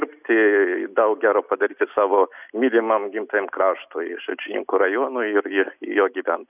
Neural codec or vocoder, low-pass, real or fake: none; 3.6 kHz; real